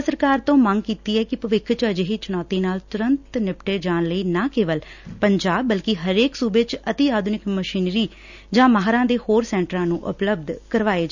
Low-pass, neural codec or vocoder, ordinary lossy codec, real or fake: 7.2 kHz; none; none; real